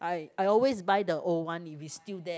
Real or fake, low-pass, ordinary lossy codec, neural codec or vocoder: real; none; none; none